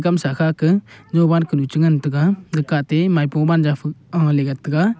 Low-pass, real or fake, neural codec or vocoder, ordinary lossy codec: none; real; none; none